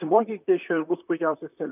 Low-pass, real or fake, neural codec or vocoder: 3.6 kHz; fake; vocoder, 44.1 kHz, 128 mel bands, Pupu-Vocoder